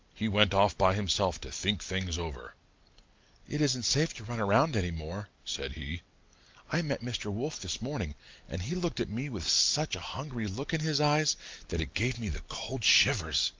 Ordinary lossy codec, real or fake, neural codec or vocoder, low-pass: Opus, 24 kbps; real; none; 7.2 kHz